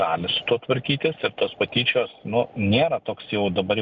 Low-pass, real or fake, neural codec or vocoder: 7.2 kHz; real; none